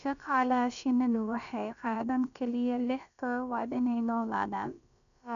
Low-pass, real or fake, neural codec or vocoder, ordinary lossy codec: 7.2 kHz; fake; codec, 16 kHz, about 1 kbps, DyCAST, with the encoder's durations; none